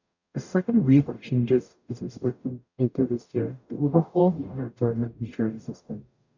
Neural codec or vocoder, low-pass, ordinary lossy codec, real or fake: codec, 44.1 kHz, 0.9 kbps, DAC; 7.2 kHz; none; fake